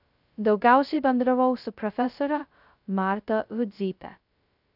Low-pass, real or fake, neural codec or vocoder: 5.4 kHz; fake; codec, 16 kHz, 0.2 kbps, FocalCodec